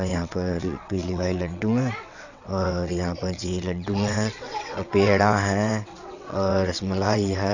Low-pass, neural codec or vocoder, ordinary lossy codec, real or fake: 7.2 kHz; vocoder, 22.05 kHz, 80 mel bands, WaveNeXt; none; fake